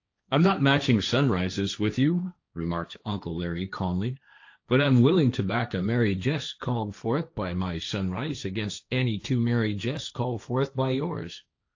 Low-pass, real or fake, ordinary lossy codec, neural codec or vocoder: 7.2 kHz; fake; AAC, 48 kbps; codec, 16 kHz, 1.1 kbps, Voila-Tokenizer